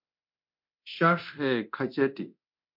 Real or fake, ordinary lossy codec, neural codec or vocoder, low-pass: fake; MP3, 48 kbps; codec, 24 kHz, 0.9 kbps, DualCodec; 5.4 kHz